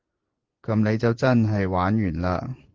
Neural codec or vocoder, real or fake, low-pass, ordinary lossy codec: vocoder, 24 kHz, 100 mel bands, Vocos; fake; 7.2 kHz; Opus, 16 kbps